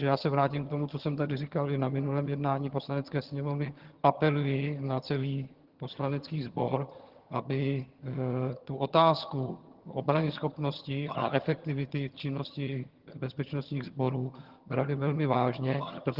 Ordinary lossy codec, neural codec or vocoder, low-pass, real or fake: Opus, 16 kbps; vocoder, 22.05 kHz, 80 mel bands, HiFi-GAN; 5.4 kHz; fake